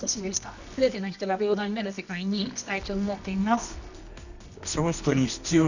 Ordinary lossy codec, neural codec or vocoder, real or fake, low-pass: none; codec, 24 kHz, 0.9 kbps, WavTokenizer, medium music audio release; fake; 7.2 kHz